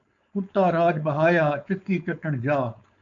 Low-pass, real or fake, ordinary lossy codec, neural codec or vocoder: 7.2 kHz; fake; MP3, 96 kbps; codec, 16 kHz, 4.8 kbps, FACodec